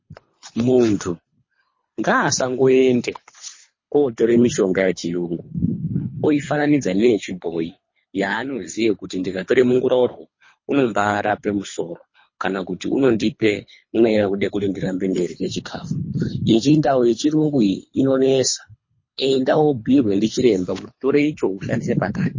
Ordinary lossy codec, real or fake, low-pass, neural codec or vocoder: MP3, 32 kbps; fake; 7.2 kHz; codec, 24 kHz, 3 kbps, HILCodec